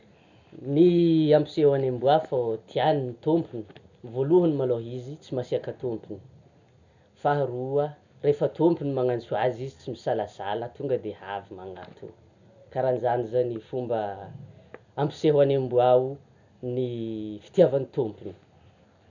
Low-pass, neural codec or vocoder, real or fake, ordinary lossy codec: 7.2 kHz; none; real; none